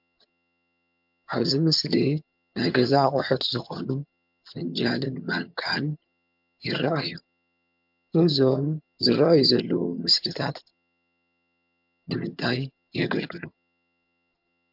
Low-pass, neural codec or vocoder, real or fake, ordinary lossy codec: 5.4 kHz; vocoder, 22.05 kHz, 80 mel bands, HiFi-GAN; fake; MP3, 48 kbps